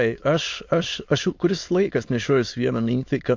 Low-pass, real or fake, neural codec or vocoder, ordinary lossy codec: 7.2 kHz; fake; autoencoder, 22.05 kHz, a latent of 192 numbers a frame, VITS, trained on many speakers; MP3, 48 kbps